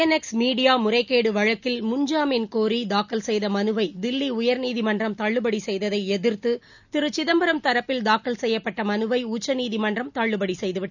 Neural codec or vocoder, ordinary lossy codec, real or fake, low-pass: none; none; real; 7.2 kHz